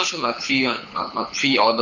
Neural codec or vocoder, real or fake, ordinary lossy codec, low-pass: codec, 24 kHz, 6 kbps, HILCodec; fake; none; 7.2 kHz